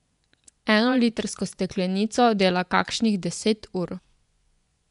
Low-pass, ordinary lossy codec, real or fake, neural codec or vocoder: 10.8 kHz; none; fake; vocoder, 24 kHz, 100 mel bands, Vocos